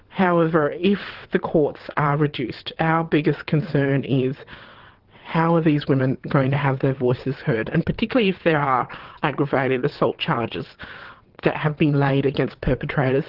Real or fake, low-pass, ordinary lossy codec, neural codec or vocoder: fake; 5.4 kHz; Opus, 16 kbps; vocoder, 22.05 kHz, 80 mel bands, WaveNeXt